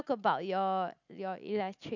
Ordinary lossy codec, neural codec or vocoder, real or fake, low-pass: none; none; real; 7.2 kHz